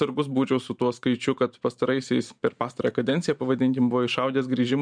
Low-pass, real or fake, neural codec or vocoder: 9.9 kHz; fake; vocoder, 44.1 kHz, 128 mel bands every 512 samples, BigVGAN v2